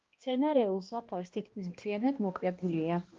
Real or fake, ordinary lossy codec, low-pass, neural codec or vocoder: fake; Opus, 32 kbps; 7.2 kHz; codec, 16 kHz, 1 kbps, X-Codec, HuBERT features, trained on balanced general audio